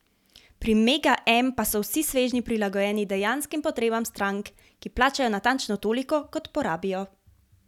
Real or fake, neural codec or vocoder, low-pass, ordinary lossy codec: real; none; 19.8 kHz; none